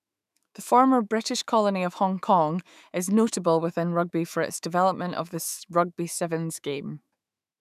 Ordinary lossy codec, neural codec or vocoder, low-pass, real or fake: none; autoencoder, 48 kHz, 128 numbers a frame, DAC-VAE, trained on Japanese speech; 14.4 kHz; fake